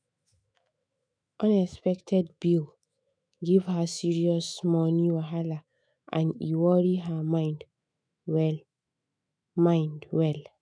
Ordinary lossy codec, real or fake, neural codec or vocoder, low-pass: none; fake; autoencoder, 48 kHz, 128 numbers a frame, DAC-VAE, trained on Japanese speech; 9.9 kHz